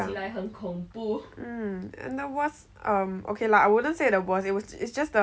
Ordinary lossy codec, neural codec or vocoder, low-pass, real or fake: none; none; none; real